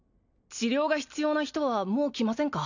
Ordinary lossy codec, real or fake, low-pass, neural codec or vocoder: none; real; 7.2 kHz; none